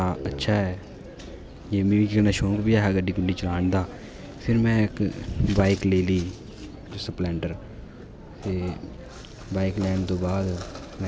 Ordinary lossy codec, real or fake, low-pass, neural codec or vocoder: none; real; none; none